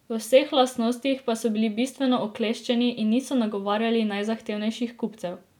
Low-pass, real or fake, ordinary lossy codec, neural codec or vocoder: 19.8 kHz; real; none; none